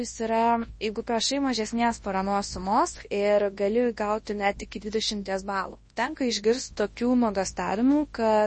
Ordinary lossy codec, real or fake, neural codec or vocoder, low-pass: MP3, 32 kbps; fake; codec, 24 kHz, 0.9 kbps, WavTokenizer, large speech release; 10.8 kHz